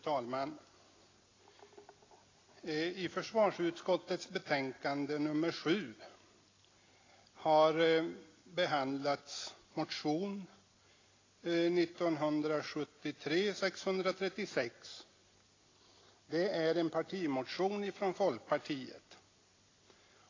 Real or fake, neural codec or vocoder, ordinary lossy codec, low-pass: real; none; AAC, 32 kbps; 7.2 kHz